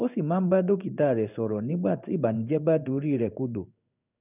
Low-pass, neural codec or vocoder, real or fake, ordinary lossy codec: 3.6 kHz; codec, 16 kHz in and 24 kHz out, 1 kbps, XY-Tokenizer; fake; none